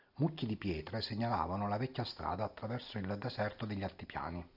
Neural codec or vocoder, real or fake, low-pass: none; real; 5.4 kHz